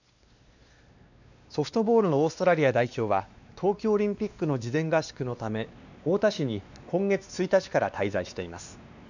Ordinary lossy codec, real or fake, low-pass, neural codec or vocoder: none; fake; 7.2 kHz; codec, 16 kHz, 2 kbps, X-Codec, WavLM features, trained on Multilingual LibriSpeech